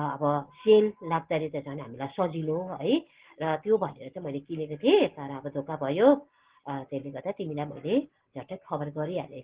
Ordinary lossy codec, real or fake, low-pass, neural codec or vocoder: Opus, 16 kbps; real; 3.6 kHz; none